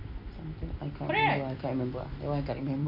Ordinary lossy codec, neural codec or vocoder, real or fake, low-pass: none; none; real; 5.4 kHz